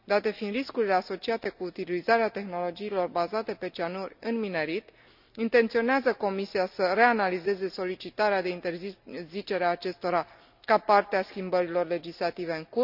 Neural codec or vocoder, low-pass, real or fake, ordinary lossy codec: none; 5.4 kHz; real; none